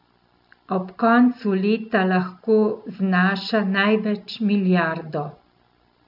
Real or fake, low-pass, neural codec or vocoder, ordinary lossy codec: real; 5.4 kHz; none; none